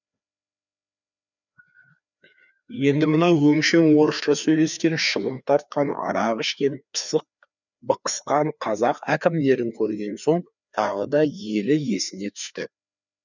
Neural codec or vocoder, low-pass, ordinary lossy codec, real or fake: codec, 16 kHz, 2 kbps, FreqCodec, larger model; 7.2 kHz; none; fake